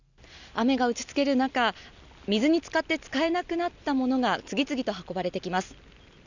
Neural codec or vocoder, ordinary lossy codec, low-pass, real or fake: none; none; 7.2 kHz; real